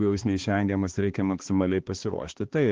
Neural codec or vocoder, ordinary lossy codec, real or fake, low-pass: codec, 16 kHz, 2 kbps, X-Codec, HuBERT features, trained on balanced general audio; Opus, 16 kbps; fake; 7.2 kHz